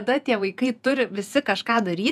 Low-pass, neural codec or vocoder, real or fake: 14.4 kHz; vocoder, 48 kHz, 128 mel bands, Vocos; fake